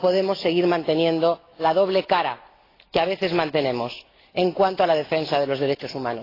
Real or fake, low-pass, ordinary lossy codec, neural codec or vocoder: real; 5.4 kHz; AAC, 24 kbps; none